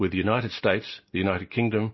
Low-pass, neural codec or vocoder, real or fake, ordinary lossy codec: 7.2 kHz; none; real; MP3, 24 kbps